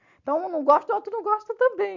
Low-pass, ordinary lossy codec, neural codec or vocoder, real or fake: 7.2 kHz; none; none; real